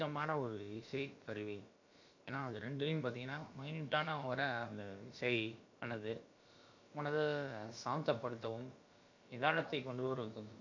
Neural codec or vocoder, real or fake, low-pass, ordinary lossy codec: codec, 16 kHz, about 1 kbps, DyCAST, with the encoder's durations; fake; 7.2 kHz; MP3, 48 kbps